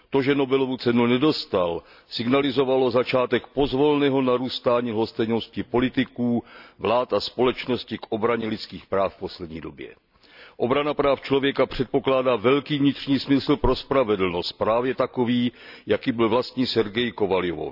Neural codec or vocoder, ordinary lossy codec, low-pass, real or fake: none; none; 5.4 kHz; real